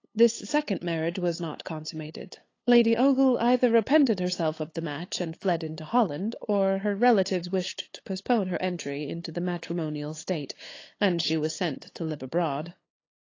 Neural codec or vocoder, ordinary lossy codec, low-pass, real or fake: codec, 16 kHz, 8 kbps, FunCodec, trained on LibriTTS, 25 frames a second; AAC, 32 kbps; 7.2 kHz; fake